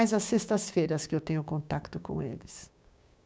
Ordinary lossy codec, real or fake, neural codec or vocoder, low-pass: none; fake; codec, 16 kHz, 6 kbps, DAC; none